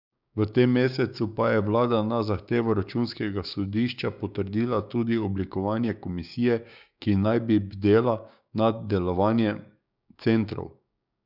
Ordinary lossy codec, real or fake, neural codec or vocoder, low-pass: none; fake; codec, 16 kHz, 6 kbps, DAC; 5.4 kHz